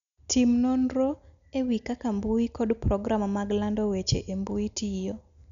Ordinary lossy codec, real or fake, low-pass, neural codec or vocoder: none; real; 7.2 kHz; none